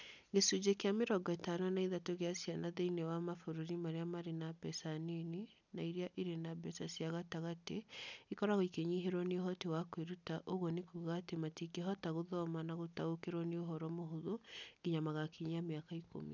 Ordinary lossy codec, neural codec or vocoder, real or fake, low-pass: none; none; real; 7.2 kHz